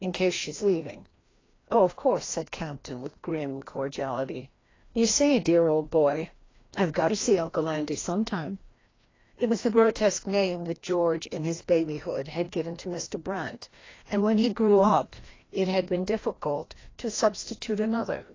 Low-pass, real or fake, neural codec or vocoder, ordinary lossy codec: 7.2 kHz; fake; codec, 16 kHz, 1 kbps, FreqCodec, larger model; AAC, 32 kbps